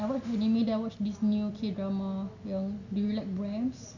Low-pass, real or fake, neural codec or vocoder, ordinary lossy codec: 7.2 kHz; real; none; none